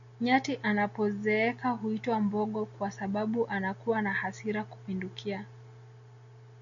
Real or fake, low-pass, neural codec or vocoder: real; 7.2 kHz; none